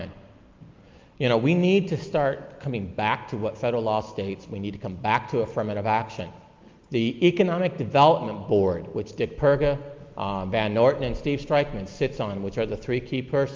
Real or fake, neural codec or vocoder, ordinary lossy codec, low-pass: real; none; Opus, 24 kbps; 7.2 kHz